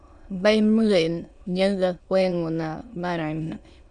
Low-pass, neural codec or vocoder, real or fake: 9.9 kHz; autoencoder, 22.05 kHz, a latent of 192 numbers a frame, VITS, trained on many speakers; fake